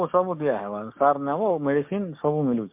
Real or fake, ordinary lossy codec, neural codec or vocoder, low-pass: fake; MP3, 32 kbps; codec, 16 kHz, 6 kbps, DAC; 3.6 kHz